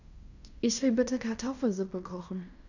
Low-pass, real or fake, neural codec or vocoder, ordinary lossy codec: 7.2 kHz; fake; codec, 16 kHz in and 24 kHz out, 0.9 kbps, LongCat-Audio-Codec, fine tuned four codebook decoder; none